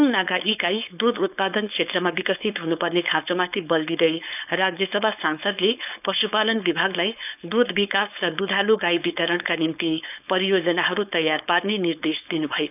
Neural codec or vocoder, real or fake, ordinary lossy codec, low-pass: codec, 16 kHz, 4.8 kbps, FACodec; fake; none; 3.6 kHz